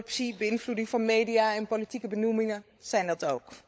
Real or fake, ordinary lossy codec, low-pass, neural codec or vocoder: fake; none; none; codec, 16 kHz, 16 kbps, FunCodec, trained on LibriTTS, 50 frames a second